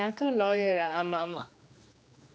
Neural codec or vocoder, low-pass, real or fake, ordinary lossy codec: codec, 16 kHz, 2 kbps, X-Codec, HuBERT features, trained on general audio; none; fake; none